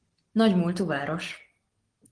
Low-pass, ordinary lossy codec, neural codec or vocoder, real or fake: 9.9 kHz; Opus, 16 kbps; none; real